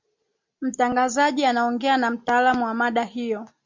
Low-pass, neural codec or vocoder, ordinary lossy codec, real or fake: 7.2 kHz; none; MP3, 48 kbps; real